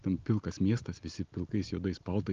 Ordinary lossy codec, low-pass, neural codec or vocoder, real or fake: Opus, 32 kbps; 7.2 kHz; none; real